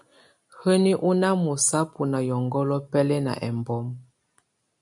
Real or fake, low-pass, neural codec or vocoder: real; 10.8 kHz; none